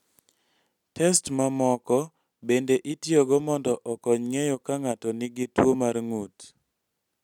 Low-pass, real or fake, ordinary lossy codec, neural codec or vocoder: 19.8 kHz; real; none; none